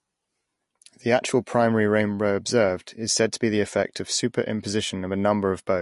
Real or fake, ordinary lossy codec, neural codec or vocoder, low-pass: real; MP3, 48 kbps; none; 14.4 kHz